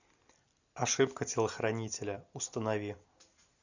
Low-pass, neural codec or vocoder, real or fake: 7.2 kHz; none; real